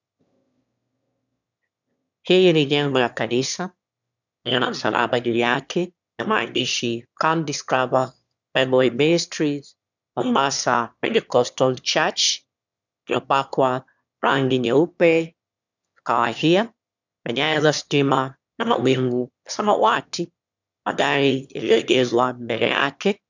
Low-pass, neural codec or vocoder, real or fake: 7.2 kHz; autoencoder, 22.05 kHz, a latent of 192 numbers a frame, VITS, trained on one speaker; fake